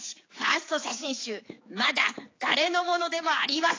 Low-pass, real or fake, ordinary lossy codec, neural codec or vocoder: 7.2 kHz; fake; AAC, 32 kbps; codec, 16 kHz, 4 kbps, X-Codec, HuBERT features, trained on general audio